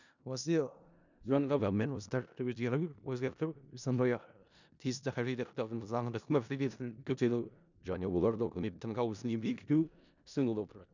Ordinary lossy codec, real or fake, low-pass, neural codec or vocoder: none; fake; 7.2 kHz; codec, 16 kHz in and 24 kHz out, 0.4 kbps, LongCat-Audio-Codec, four codebook decoder